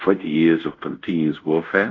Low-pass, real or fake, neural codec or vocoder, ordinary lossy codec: 7.2 kHz; fake; codec, 24 kHz, 0.5 kbps, DualCodec; AAC, 48 kbps